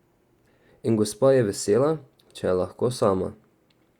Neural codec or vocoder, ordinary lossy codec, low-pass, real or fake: none; Opus, 64 kbps; 19.8 kHz; real